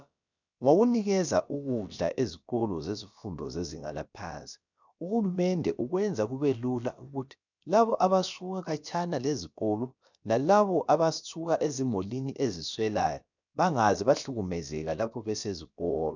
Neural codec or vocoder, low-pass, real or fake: codec, 16 kHz, about 1 kbps, DyCAST, with the encoder's durations; 7.2 kHz; fake